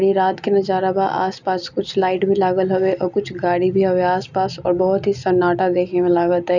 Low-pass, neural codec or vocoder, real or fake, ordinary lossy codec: 7.2 kHz; none; real; none